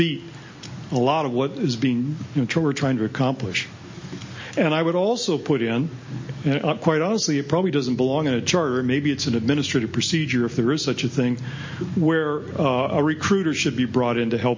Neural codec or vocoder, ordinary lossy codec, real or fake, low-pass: none; MP3, 32 kbps; real; 7.2 kHz